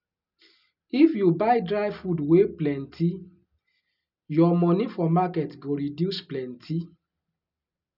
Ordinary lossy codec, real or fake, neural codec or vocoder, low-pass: none; real; none; 5.4 kHz